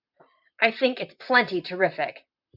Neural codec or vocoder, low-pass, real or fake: vocoder, 44.1 kHz, 128 mel bands, Pupu-Vocoder; 5.4 kHz; fake